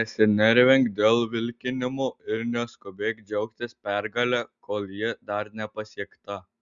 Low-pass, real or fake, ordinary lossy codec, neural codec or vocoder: 7.2 kHz; real; Opus, 64 kbps; none